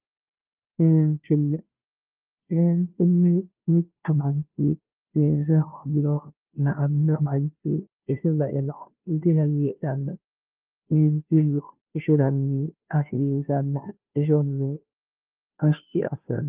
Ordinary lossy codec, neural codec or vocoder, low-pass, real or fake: Opus, 32 kbps; codec, 16 kHz, 0.5 kbps, FunCodec, trained on Chinese and English, 25 frames a second; 3.6 kHz; fake